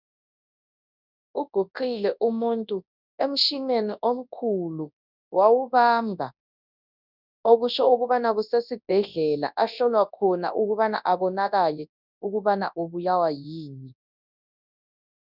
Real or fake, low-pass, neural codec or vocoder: fake; 5.4 kHz; codec, 24 kHz, 0.9 kbps, WavTokenizer, large speech release